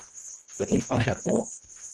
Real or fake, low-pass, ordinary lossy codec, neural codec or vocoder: fake; 10.8 kHz; Opus, 24 kbps; codec, 24 kHz, 1.5 kbps, HILCodec